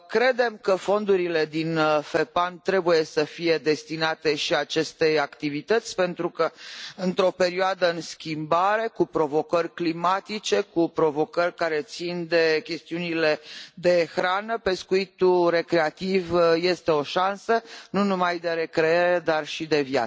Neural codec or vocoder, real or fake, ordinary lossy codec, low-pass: none; real; none; none